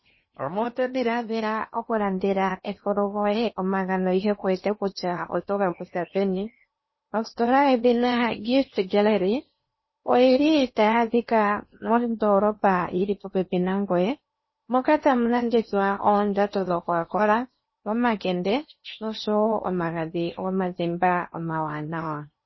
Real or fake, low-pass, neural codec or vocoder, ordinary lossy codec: fake; 7.2 kHz; codec, 16 kHz in and 24 kHz out, 0.8 kbps, FocalCodec, streaming, 65536 codes; MP3, 24 kbps